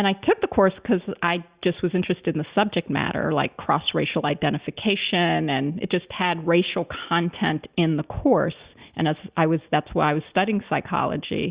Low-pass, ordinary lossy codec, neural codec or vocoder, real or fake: 3.6 kHz; Opus, 64 kbps; none; real